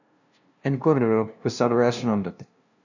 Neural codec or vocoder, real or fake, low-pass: codec, 16 kHz, 0.5 kbps, FunCodec, trained on LibriTTS, 25 frames a second; fake; 7.2 kHz